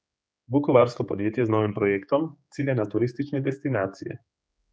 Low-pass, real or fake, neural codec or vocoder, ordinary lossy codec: none; fake; codec, 16 kHz, 4 kbps, X-Codec, HuBERT features, trained on general audio; none